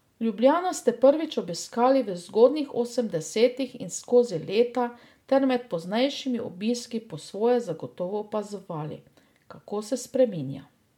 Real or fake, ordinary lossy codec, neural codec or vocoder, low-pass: real; MP3, 96 kbps; none; 19.8 kHz